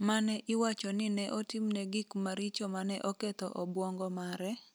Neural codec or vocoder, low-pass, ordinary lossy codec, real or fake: none; none; none; real